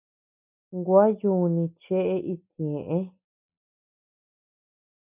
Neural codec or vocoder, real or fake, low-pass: none; real; 3.6 kHz